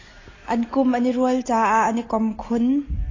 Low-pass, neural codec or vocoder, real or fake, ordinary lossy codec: 7.2 kHz; none; real; AAC, 32 kbps